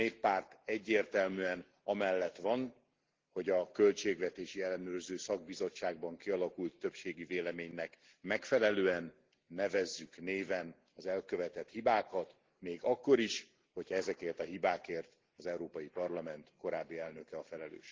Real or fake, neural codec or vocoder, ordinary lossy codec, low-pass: real; none; Opus, 16 kbps; 7.2 kHz